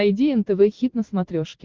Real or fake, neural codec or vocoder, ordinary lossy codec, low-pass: real; none; Opus, 16 kbps; 7.2 kHz